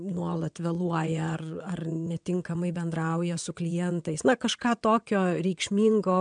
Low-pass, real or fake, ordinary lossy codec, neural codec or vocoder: 9.9 kHz; fake; MP3, 96 kbps; vocoder, 22.05 kHz, 80 mel bands, WaveNeXt